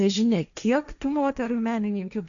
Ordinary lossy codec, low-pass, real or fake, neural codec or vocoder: MP3, 96 kbps; 7.2 kHz; fake; codec, 16 kHz, 1.1 kbps, Voila-Tokenizer